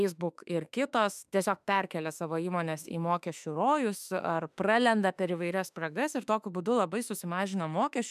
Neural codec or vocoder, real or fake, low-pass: autoencoder, 48 kHz, 32 numbers a frame, DAC-VAE, trained on Japanese speech; fake; 14.4 kHz